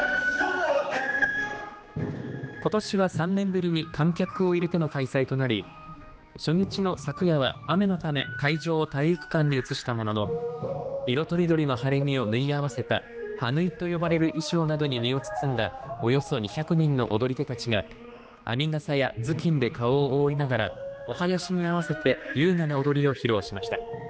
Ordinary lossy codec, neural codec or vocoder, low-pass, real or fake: none; codec, 16 kHz, 2 kbps, X-Codec, HuBERT features, trained on general audio; none; fake